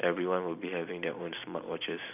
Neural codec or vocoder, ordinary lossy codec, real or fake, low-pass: vocoder, 44.1 kHz, 128 mel bands every 512 samples, BigVGAN v2; none; fake; 3.6 kHz